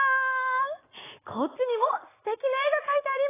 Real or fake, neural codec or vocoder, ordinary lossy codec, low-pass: real; none; AAC, 16 kbps; 3.6 kHz